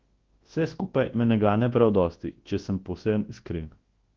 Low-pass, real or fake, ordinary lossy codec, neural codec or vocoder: 7.2 kHz; fake; Opus, 16 kbps; codec, 24 kHz, 0.9 kbps, WavTokenizer, large speech release